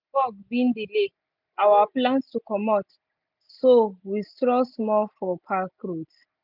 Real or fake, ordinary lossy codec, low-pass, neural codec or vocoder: real; none; 5.4 kHz; none